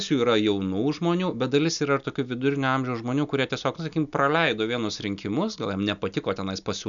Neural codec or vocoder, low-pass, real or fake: none; 7.2 kHz; real